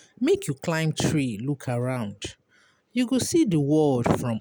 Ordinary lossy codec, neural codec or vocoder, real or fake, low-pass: none; none; real; none